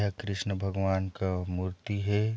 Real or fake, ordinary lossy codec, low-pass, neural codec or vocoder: real; none; none; none